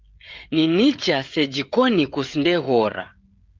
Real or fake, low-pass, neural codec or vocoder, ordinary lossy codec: fake; 7.2 kHz; codec, 16 kHz, 16 kbps, FreqCodec, smaller model; Opus, 24 kbps